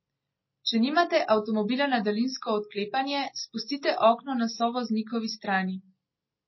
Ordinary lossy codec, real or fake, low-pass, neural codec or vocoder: MP3, 24 kbps; real; 7.2 kHz; none